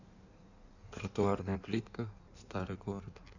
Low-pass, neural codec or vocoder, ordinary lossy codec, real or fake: 7.2 kHz; codec, 16 kHz in and 24 kHz out, 1.1 kbps, FireRedTTS-2 codec; none; fake